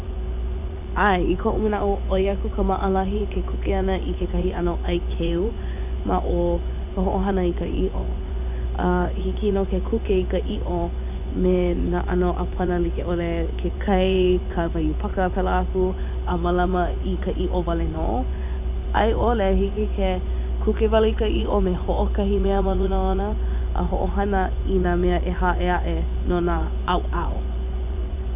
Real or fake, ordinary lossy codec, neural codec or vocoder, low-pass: fake; none; vocoder, 24 kHz, 100 mel bands, Vocos; 3.6 kHz